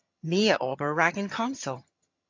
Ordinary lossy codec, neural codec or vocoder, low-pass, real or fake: MP3, 48 kbps; vocoder, 22.05 kHz, 80 mel bands, HiFi-GAN; 7.2 kHz; fake